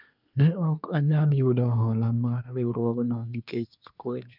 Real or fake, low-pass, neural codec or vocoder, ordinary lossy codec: fake; 5.4 kHz; codec, 24 kHz, 1 kbps, SNAC; none